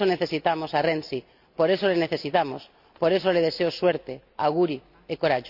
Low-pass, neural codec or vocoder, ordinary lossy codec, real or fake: 5.4 kHz; none; none; real